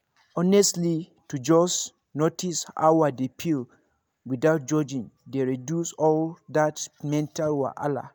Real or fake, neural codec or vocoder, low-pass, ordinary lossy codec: real; none; none; none